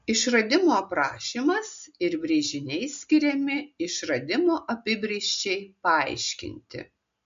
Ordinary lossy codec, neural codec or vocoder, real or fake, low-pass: MP3, 48 kbps; none; real; 7.2 kHz